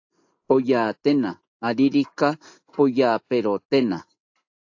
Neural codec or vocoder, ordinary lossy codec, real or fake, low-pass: none; AAC, 48 kbps; real; 7.2 kHz